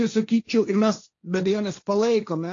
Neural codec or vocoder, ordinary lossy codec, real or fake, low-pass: codec, 16 kHz, 1.1 kbps, Voila-Tokenizer; AAC, 48 kbps; fake; 7.2 kHz